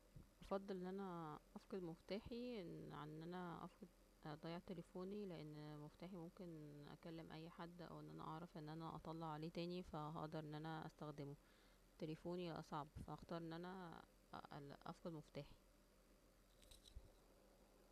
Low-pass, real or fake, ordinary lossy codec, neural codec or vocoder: 14.4 kHz; real; none; none